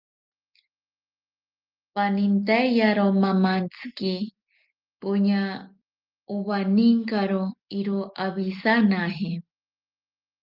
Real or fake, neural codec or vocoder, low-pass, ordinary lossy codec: real; none; 5.4 kHz; Opus, 32 kbps